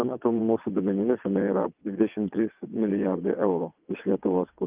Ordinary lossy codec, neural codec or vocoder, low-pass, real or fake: Opus, 24 kbps; vocoder, 22.05 kHz, 80 mel bands, WaveNeXt; 3.6 kHz; fake